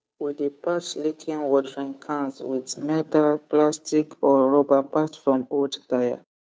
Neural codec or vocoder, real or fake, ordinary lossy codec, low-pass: codec, 16 kHz, 2 kbps, FunCodec, trained on Chinese and English, 25 frames a second; fake; none; none